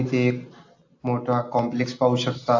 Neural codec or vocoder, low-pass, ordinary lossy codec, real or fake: none; 7.2 kHz; AAC, 48 kbps; real